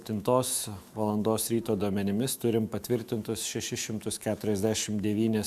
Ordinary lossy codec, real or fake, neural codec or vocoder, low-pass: Opus, 64 kbps; fake; autoencoder, 48 kHz, 128 numbers a frame, DAC-VAE, trained on Japanese speech; 14.4 kHz